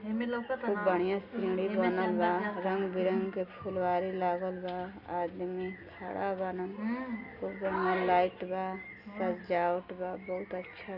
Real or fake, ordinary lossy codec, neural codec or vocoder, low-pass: real; Opus, 64 kbps; none; 5.4 kHz